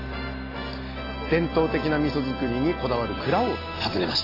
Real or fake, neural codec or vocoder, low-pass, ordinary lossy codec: real; none; 5.4 kHz; AAC, 24 kbps